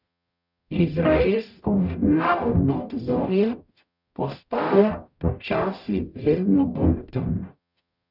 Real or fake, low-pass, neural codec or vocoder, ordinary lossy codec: fake; 5.4 kHz; codec, 44.1 kHz, 0.9 kbps, DAC; none